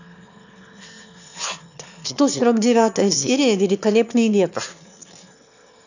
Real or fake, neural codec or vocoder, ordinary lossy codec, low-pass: fake; autoencoder, 22.05 kHz, a latent of 192 numbers a frame, VITS, trained on one speaker; none; 7.2 kHz